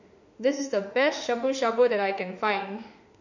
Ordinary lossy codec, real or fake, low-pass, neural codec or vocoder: none; fake; 7.2 kHz; autoencoder, 48 kHz, 32 numbers a frame, DAC-VAE, trained on Japanese speech